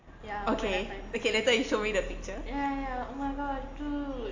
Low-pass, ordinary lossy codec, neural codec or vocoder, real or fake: 7.2 kHz; AAC, 48 kbps; none; real